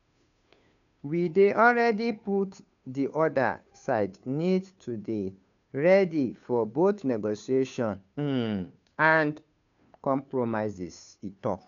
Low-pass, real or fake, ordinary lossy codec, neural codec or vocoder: 7.2 kHz; fake; none; codec, 16 kHz, 2 kbps, FunCodec, trained on Chinese and English, 25 frames a second